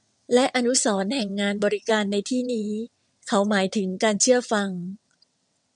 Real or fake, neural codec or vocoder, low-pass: fake; vocoder, 22.05 kHz, 80 mel bands, WaveNeXt; 9.9 kHz